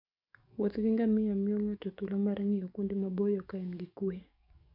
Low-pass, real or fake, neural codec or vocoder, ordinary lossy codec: 5.4 kHz; fake; codec, 44.1 kHz, 7.8 kbps, DAC; none